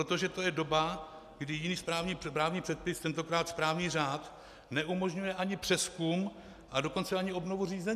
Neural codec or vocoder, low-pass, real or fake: none; 14.4 kHz; real